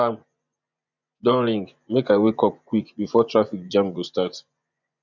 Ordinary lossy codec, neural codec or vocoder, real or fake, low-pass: none; vocoder, 24 kHz, 100 mel bands, Vocos; fake; 7.2 kHz